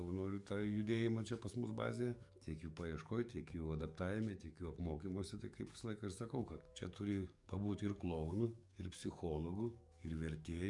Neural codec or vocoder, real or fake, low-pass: codec, 44.1 kHz, 7.8 kbps, DAC; fake; 10.8 kHz